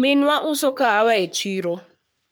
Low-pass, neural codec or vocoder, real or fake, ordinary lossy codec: none; codec, 44.1 kHz, 3.4 kbps, Pupu-Codec; fake; none